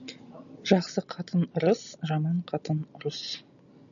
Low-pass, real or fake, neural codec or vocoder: 7.2 kHz; real; none